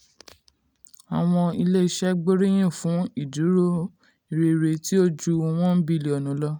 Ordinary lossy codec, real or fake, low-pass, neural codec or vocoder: none; real; 19.8 kHz; none